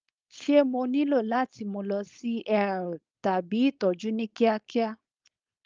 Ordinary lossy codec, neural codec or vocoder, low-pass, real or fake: Opus, 24 kbps; codec, 16 kHz, 4.8 kbps, FACodec; 7.2 kHz; fake